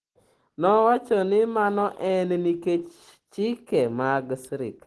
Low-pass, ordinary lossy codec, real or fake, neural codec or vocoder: 10.8 kHz; Opus, 16 kbps; real; none